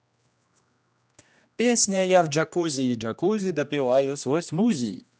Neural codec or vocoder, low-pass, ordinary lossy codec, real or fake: codec, 16 kHz, 1 kbps, X-Codec, HuBERT features, trained on general audio; none; none; fake